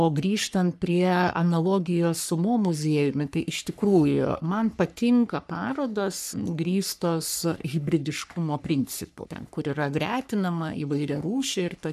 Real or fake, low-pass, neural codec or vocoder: fake; 14.4 kHz; codec, 44.1 kHz, 3.4 kbps, Pupu-Codec